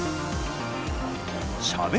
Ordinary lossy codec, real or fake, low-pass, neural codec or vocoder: none; real; none; none